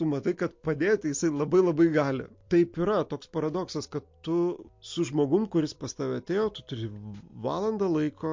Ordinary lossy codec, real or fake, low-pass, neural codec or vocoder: MP3, 48 kbps; real; 7.2 kHz; none